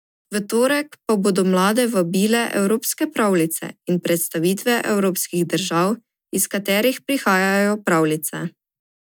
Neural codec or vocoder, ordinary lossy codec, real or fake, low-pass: none; none; real; none